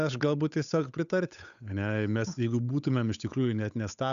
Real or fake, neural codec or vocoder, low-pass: fake; codec, 16 kHz, 8 kbps, FunCodec, trained on Chinese and English, 25 frames a second; 7.2 kHz